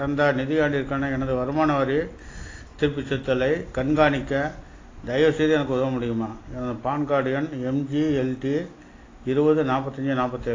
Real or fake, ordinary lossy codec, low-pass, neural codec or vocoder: real; AAC, 32 kbps; 7.2 kHz; none